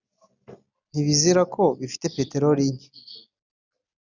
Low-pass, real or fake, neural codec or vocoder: 7.2 kHz; real; none